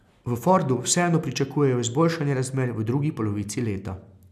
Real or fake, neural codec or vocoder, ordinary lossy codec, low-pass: real; none; none; 14.4 kHz